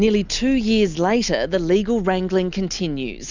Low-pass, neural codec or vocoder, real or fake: 7.2 kHz; none; real